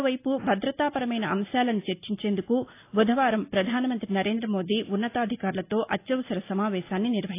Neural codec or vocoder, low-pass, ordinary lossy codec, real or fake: none; 3.6 kHz; AAC, 24 kbps; real